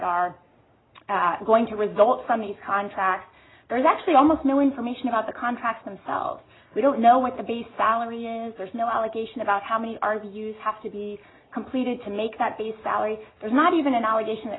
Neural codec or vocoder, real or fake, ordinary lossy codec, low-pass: none; real; AAC, 16 kbps; 7.2 kHz